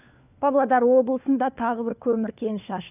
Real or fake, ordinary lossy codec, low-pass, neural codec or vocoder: fake; none; 3.6 kHz; codec, 16 kHz, 4 kbps, FunCodec, trained on LibriTTS, 50 frames a second